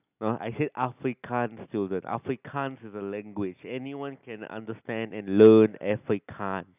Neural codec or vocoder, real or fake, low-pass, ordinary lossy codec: none; real; 3.6 kHz; none